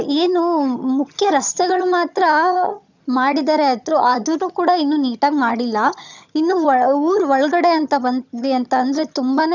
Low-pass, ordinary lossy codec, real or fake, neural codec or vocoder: 7.2 kHz; none; fake; vocoder, 22.05 kHz, 80 mel bands, HiFi-GAN